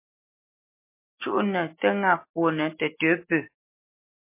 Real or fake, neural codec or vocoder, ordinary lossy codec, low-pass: real; none; MP3, 24 kbps; 3.6 kHz